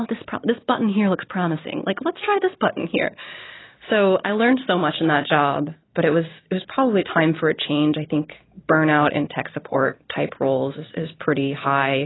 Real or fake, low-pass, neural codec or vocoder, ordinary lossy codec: real; 7.2 kHz; none; AAC, 16 kbps